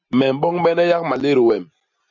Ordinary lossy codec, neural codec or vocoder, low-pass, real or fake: MP3, 64 kbps; none; 7.2 kHz; real